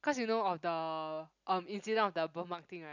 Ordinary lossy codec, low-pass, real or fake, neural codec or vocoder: none; 7.2 kHz; real; none